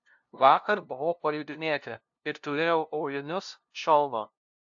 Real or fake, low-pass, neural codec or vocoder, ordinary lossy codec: fake; 7.2 kHz; codec, 16 kHz, 0.5 kbps, FunCodec, trained on LibriTTS, 25 frames a second; AAC, 64 kbps